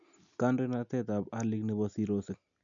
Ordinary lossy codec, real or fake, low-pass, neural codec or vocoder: none; real; 7.2 kHz; none